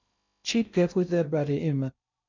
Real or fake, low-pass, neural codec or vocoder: fake; 7.2 kHz; codec, 16 kHz in and 24 kHz out, 0.6 kbps, FocalCodec, streaming, 2048 codes